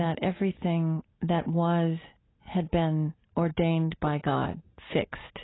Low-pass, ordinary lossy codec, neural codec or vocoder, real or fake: 7.2 kHz; AAC, 16 kbps; none; real